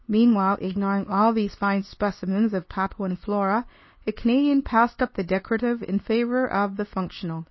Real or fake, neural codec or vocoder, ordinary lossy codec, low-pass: fake; codec, 24 kHz, 0.9 kbps, WavTokenizer, medium speech release version 2; MP3, 24 kbps; 7.2 kHz